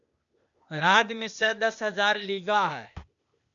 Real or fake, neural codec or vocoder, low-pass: fake; codec, 16 kHz, 0.8 kbps, ZipCodec; 7.2 kHz